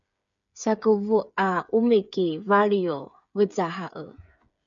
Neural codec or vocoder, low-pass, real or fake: codec, 16 kHz, 8 kbps, FreqCodec, smaller model; 7.2 kHz; fake